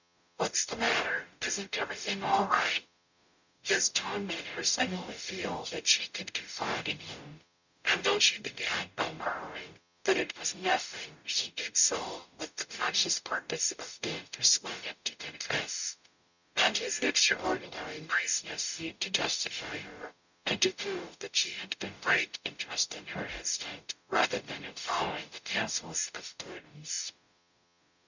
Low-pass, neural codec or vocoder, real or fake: 7.2 kHz; codec, 44.1 kHz, 0.9 kbps, DAC; fake